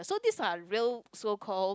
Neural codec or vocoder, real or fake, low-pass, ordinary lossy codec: none; real; none; none